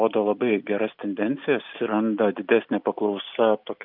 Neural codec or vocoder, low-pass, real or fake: none; 5.4 kHz; real